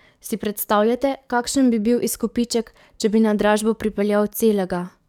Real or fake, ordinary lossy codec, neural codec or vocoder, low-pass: fake; none; codec, 44.1 kHz, 7.8 kbps, DAC; 19.8 kHz